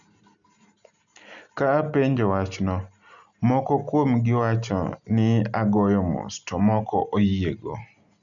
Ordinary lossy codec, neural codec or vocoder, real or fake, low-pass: none; none; real; 7.2 kHz